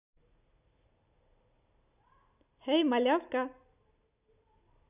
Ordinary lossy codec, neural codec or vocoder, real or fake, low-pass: none; none; real; 3.6 kHz